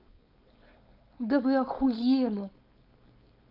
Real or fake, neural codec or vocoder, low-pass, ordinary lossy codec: fake; codec, 16 kHz, 4.8 kbps, FACodec; 5.4 kHz; none